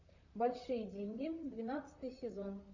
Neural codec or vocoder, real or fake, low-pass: vocoder, 44.1 kHz, 128 mel bands, Pupu-Vocoder; fake; 7.2 kHz